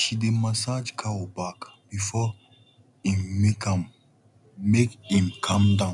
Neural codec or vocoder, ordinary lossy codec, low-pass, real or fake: none; none; 10.8 kHz; real